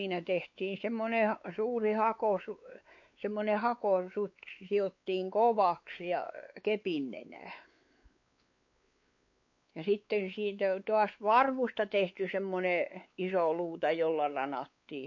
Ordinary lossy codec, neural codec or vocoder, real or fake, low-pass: MP3, 48 kbps; codec, 16 kHz, 4 kbps, X-Codec, WavLM features, trained on Multilingual LibriSpeech; fake; 7.2 kHz